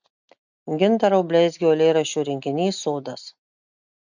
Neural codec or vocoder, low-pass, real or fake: none; 7.2 kHz; real